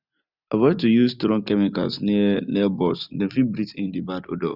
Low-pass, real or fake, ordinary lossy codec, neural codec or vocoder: 5.4 kHz; real; none; none